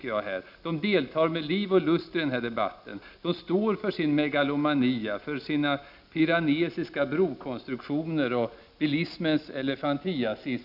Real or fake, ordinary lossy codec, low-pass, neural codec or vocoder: real; none; 5.4 kHz; none